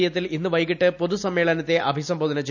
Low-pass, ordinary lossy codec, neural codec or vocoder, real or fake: 7.2 kHz; none; none; real